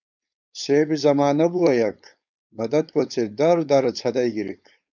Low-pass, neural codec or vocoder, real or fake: 7.2 kHz; codec, 16 kHz, 4.8 kbps, FACodec; fake